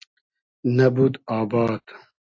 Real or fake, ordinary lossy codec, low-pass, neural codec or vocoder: real; AAC, 48 kbps; 7.2 kHz; none